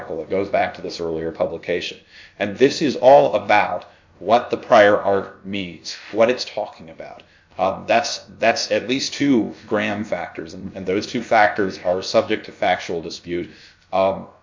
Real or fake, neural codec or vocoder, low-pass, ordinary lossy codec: fake; codec, 16 kHz, about 1 kbps, DyCAST, with the encoder's durations; 7.2 kHz; MP3, 48 kbps